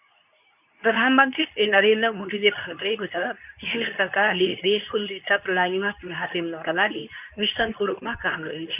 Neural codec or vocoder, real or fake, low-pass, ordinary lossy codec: codec, 24 kHz, 0.9 kbps, WavTokenizer, medium speech release version 2; fake; 3.6 kHz; none